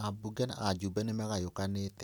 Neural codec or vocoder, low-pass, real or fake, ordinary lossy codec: none; none; real; none